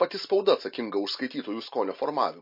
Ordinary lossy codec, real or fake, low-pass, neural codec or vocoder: MP3, 32 kbps; real; 5.4 kHz; none